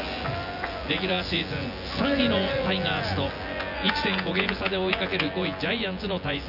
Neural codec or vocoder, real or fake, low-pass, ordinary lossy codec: vocoder, 24 kHz, 100 mel bands, Vocos; fake; 5.4 kHz; none